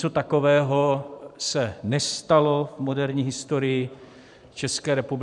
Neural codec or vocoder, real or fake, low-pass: vocoder, 44.1 kHz, 128 mel bands every 512 samples, BigVGAN v2; fake; 10.8 kHz